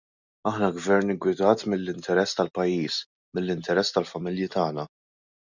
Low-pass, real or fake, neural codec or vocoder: 7.2 kHz; real; none